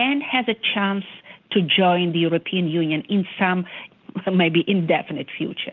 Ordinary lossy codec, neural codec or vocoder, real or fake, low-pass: Opus, 24 kbps; none; real; 7.2 kHz